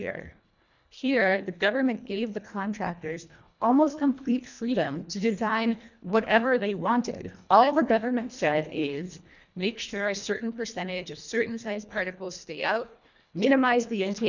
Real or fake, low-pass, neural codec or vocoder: fake; 7.2 kHz; codec, 24 kHz, 1.5 kbps, HILCodec